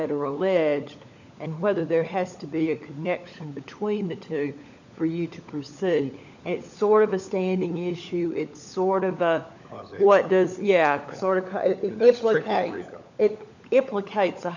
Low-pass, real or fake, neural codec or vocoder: 7.2 kHz; fake; codec, 16 kHz, 16 kbps, FunCodec, trained on LibriTTS, 50 frames a second